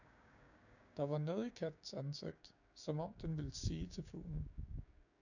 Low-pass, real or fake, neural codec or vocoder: 7.2 kHz; fake; codec, 16 kHz, 6 kbps, DAC